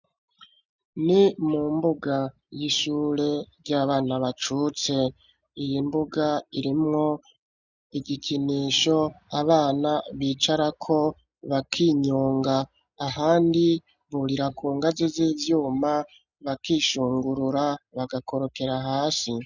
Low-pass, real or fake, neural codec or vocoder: 7.2 kHz; real; none